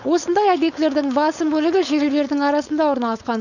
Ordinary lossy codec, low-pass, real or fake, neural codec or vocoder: none; 7.2 kHz; fake; codec, 16 kHz, 4.8 kbps, FACodec